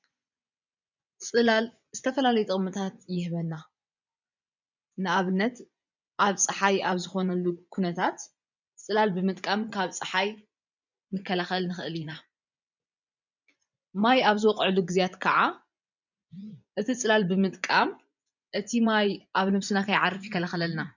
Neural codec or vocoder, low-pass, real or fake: vocoder, 24 kHz, 100 mel bands, Vocos; 7.2 kHz; fake